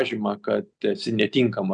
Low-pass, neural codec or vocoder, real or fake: 9.9 kHz; none; real